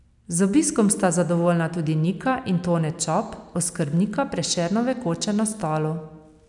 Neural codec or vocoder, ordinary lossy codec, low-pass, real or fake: none; none; 10.8 kHz; real